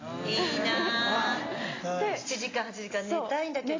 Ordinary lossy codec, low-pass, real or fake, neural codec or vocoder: AAC, 32 kbps; 7.2 kHz; real; none